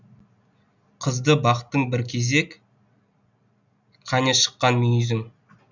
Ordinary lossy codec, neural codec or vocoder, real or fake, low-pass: none; none; real; 7.2 kHz